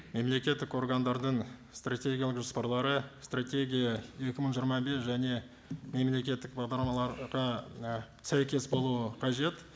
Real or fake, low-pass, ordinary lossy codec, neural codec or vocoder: real; none; none; none